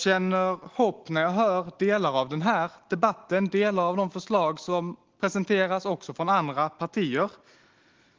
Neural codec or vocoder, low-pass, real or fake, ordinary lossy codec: none; 7.2 kHz; real; Opus, 16 kbps